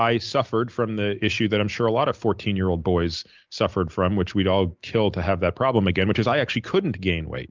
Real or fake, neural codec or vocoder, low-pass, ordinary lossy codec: real; none; 7.2 kHz; Opus, 32 kbps